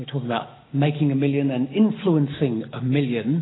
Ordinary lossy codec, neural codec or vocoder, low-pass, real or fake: AAC, 16 kbps; none; 7.2 kHz; real